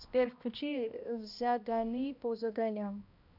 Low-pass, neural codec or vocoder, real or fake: 5.4 kHz; codec, 16 kHz, 1 kbps, X-Codec, HuBERT features, trained on balanced general audio; fake